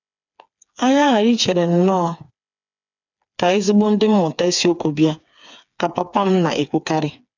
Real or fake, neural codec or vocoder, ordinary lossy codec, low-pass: fake; codec, 16 kHz, 4 kbps, FreqCodec, smaller model; none; 7.2 kHz